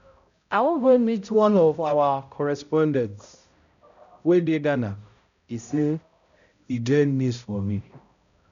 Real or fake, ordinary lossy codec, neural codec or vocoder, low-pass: fake; none; codec, 16 kHz, 0.5 kbps, X-Codec, HuBERT features, trained on balanced general audio; 7.2 kHz